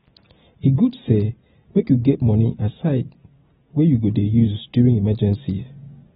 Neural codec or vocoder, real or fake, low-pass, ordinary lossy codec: none; real; 7.2 kHz; AAC, 16 kbps